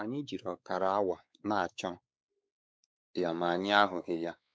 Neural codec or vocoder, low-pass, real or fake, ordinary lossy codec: codec, 16 kHz, 4 kbps, X-Codec, WavLM features, trained on Multilingual LibriSpeech; none; fake; none